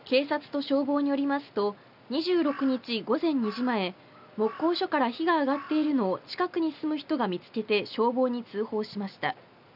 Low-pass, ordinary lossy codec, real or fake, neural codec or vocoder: 5.4 kHz; none; real; none